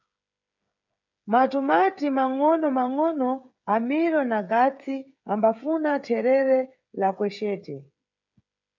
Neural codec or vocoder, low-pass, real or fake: codec, 16 kHz, 8 kbps, FreqCodec, smaller model; 7.2 kHz; fake